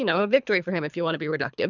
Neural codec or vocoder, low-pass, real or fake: codec, 24 kHz, 3 kbps, HILCodec; 7.2 kHz; fake